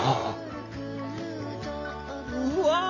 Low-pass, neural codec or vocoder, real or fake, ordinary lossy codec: 7.2 kHz; none; real; MP3, 48 kbps